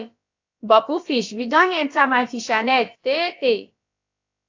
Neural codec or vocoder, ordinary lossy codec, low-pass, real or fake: codec, 16 kHz, about 1 kbps, DyCAST, with the encoder's durations; AAC, 48 kbps; 7.2 kHz; fake